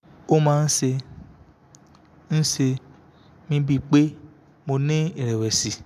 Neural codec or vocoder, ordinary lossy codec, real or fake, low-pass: none; none; real; 14.4 kHz